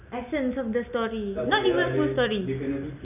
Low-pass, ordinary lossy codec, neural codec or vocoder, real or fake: 3.6 kHz; none; none; real